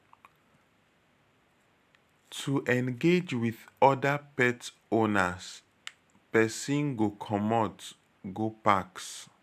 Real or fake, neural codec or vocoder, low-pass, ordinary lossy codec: real; none; 14.4 kHz; none